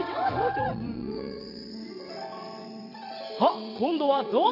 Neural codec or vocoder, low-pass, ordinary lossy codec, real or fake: vocoder, 22.05 kHz, 80 mel bands, WaveNeXt; 5.4 kHz; none; fake